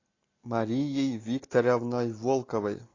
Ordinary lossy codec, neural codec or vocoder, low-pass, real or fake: AAC, 32 kbps; none; 7.2 kHz; real